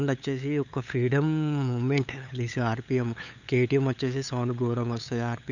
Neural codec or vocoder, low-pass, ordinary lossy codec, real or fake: codec, 16 kHz, 8 kbps, FunCodec, trained on LibriTTS, 25 frames a second; 7.2 kHz; none; fake